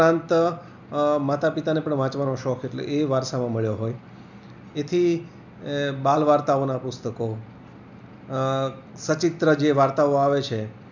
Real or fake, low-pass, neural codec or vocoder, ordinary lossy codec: real; 7.2 kHz; none; none